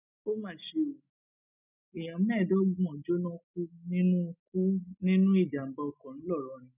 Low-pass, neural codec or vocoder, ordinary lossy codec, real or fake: 3.6 kHz; none; none; real